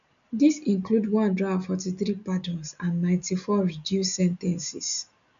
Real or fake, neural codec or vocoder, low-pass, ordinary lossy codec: real; none; 7.2 kHz; MP3, 64 kbps